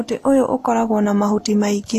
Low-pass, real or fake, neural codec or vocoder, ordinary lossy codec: 14.4 kHz; real; none; AAC, 48 kbps